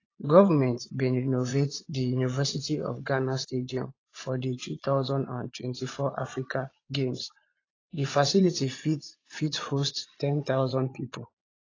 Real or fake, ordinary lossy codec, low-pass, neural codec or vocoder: fake; AAC, 32 kbps; 7.2 kHz; vocoder, 22.05 kHz, 80 mel bands, Vocos